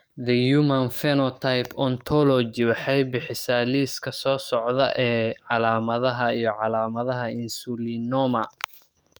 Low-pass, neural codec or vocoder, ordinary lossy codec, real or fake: none; codec, 44.1 kHz, 7.8 kbps, DAC; none; fake